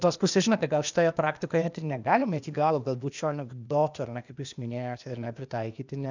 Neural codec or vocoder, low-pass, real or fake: codec, 16 kHz, 0.8 kbps, ZipCodec; 7.2 kHz; fake